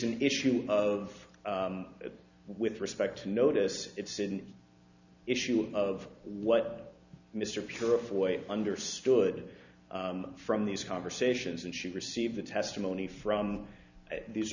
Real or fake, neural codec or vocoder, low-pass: real; none; 7.2 kHz